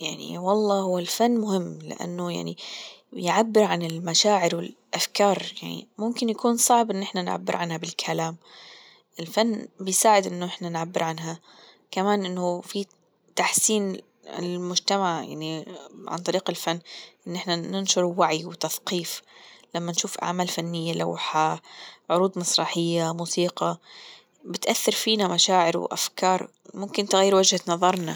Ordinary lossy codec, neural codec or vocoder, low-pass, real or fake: none; none; none; real